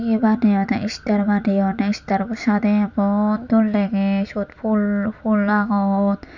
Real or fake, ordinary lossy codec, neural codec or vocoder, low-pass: real; none; none; 7.2 kHz